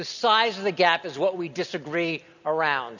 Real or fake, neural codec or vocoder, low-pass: real; none; 7.2 kHz